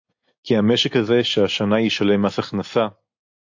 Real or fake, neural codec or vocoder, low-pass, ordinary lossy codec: real; none; 7.2 kHz; AAC, 48 kbps